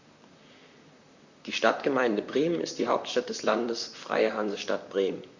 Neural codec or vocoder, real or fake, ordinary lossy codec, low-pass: vocoder, 44.1 kHz, 128 mel bands, Pupu-Vocoder; fake; Opus, 64 kbps; 7.2 kHz